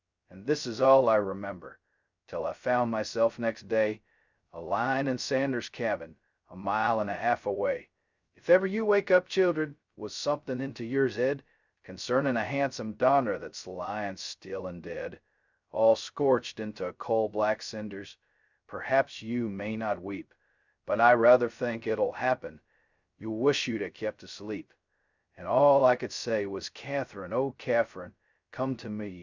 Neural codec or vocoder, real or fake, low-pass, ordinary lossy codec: codec, 16 kHz, 0.2 kbps, FocalCodec; fake; 7.2 kHz; Opus, 64 kbps